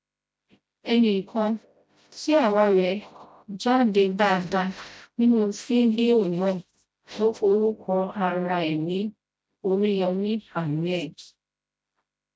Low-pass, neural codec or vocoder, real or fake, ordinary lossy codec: none; codec, 16 kHz, 0.5 kbps, FreqCodec, smaller model; fake; none